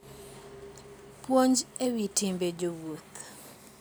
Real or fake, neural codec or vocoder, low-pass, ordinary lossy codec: real; none; none; none